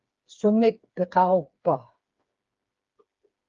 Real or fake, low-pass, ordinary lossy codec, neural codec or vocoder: fake; 7.2 kHz; Opus, 32 kbps; codec, 16 kHz, 4 kbps, FreqCodec, smaller model